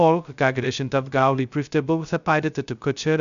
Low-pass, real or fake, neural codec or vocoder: 7.2 kHz; fake; codec, 16 kHz, 0.2 kbps, FocalCodec